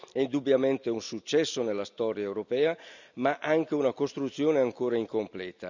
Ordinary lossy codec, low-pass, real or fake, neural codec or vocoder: none; 7.2 kHz; real; none